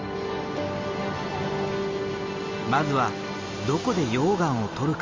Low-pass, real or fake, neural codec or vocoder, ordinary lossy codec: 7.2 kHz; real; none; Opus, 32 kbps